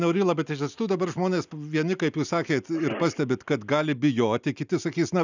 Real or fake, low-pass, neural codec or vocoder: real; 7.2 kHz; none